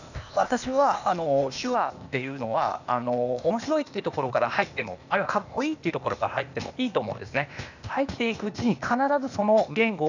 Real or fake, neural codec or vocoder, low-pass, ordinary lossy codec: fake; codec, 16 kHz, 0.8 kbps, ZipCodec; 7.2 kHz; none